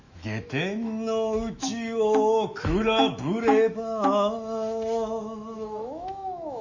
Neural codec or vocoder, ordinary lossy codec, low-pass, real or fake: autoencoder, 48 kHz, 128 numbers a frame, DAC-VAE, trained on Japanese speech; Opus, 64 kbps; 7.2 kHz; fake